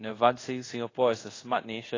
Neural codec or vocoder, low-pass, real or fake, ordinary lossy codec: codec, 24 kHz, 0.5 kbps, DualCodec; 7.2 kHz; fake; AAC, 32 kbps